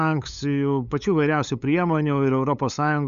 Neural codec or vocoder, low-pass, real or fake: codec, 16 kHz, 8 kbps, FunCodec, trained on LibriTTS, 25 frames a second; 7.2 kHz; fake